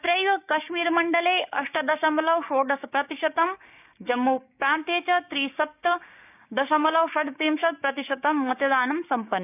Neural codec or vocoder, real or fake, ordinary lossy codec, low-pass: codec, 16 kHz, 6 kbps, DAC; fake; none; 3.6 kHz